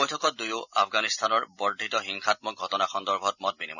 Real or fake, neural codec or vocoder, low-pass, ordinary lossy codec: real; none; 7.2 kHz; none